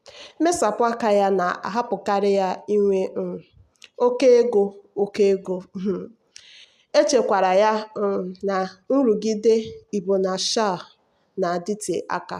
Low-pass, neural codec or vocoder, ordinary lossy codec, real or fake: 14.4 kHz; none; none; real